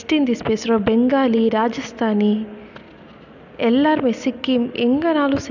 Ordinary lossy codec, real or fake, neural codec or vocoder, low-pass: none; real; none; 7.2 kHz